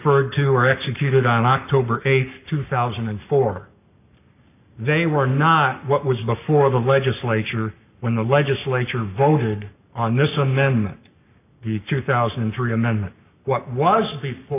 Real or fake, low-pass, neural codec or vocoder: fake; 3.6 kHz; codec, 44.1 kHz, 7.8 kbps, Pupu-Codec